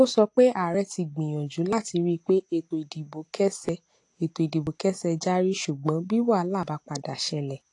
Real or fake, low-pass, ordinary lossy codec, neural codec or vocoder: real; 10.8 kHz; AAC, 48 kbps; none